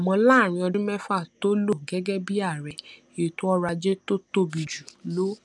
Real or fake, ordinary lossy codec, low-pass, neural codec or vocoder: real; none; none; none